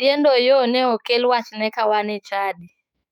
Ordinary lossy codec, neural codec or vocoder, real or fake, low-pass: none; autoencoder, 48 kHz, 128 numbers a frame, DAC-VAE, trained on Japanese speech; fake; 19.8 kHz